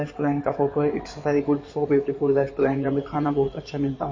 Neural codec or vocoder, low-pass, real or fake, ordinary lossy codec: codec, 16 kHz in and 24 kHz out, 2.2 kbps, FireRedTTS-2 codec; 7.2 kHz; fake; MP3, 32 kbps